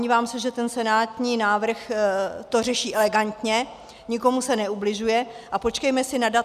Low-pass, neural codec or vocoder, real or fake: 14.4 kHz; none; real